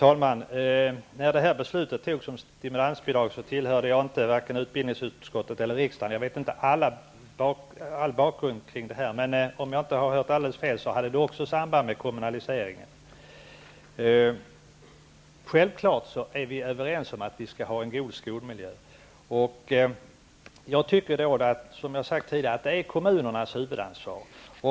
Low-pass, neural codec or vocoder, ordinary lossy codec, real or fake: none; none; none; real